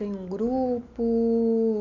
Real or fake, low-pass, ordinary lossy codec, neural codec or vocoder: real; 7.2 kHz; none; none